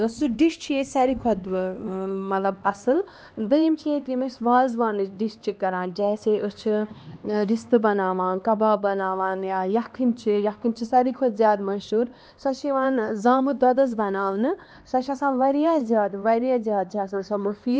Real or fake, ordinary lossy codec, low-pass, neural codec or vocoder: fake; none; none; codec, 16 kHz, 2 kbps, X-Codec, HuBERT features, trained on LibriSpeech